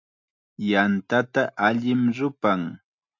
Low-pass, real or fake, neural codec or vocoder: 7.2 kHz; real; none